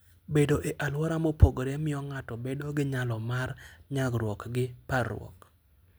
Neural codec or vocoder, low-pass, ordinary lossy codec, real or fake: none; none; none; real